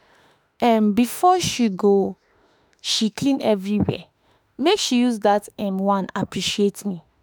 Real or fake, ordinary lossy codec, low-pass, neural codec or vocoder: fake; none; none; autoencoder, 48 kHz, 32 numbers a frame, DAC-VAE, trained on Japanese speech